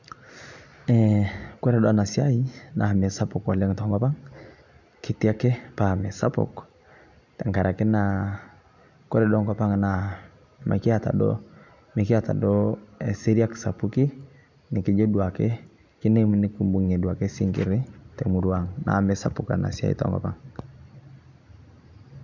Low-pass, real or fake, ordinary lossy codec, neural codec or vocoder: 7.2 kHz; real; none; none